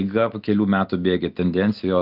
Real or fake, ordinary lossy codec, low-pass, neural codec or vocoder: real; Opus, 24 kbps; 5.4 kHz; none